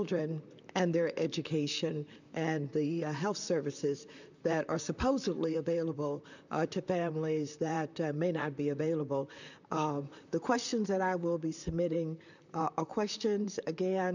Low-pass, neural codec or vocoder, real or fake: 7.2 kHz; vocoder, 44.1 kHz, 128 mel bands, Pupu-Vocoder; fake